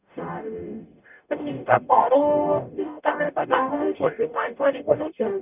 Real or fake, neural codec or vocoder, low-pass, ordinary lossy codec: fake; codec, 44.1 kHz, 0.9 kbps, DAC; 3.6 kHz; none